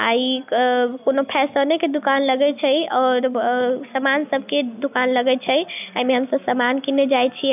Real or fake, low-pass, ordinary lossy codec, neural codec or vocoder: real; 3.6 kHz; none; none